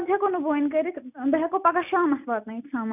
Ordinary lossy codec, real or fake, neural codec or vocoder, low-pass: none; real; none; 3.6 kHz